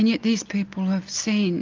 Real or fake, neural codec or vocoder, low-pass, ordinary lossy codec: real; none; 7.2 kHz; Opus, 32 kbps